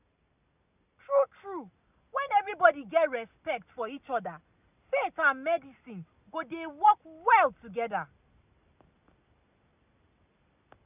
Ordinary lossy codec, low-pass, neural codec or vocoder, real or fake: none; 3.6 kHz; none; real